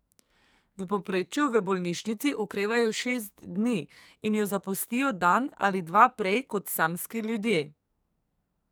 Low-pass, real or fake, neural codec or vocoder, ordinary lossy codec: none; fake; codec, 44.1 kHz, 2.6 kbps, SNAC; none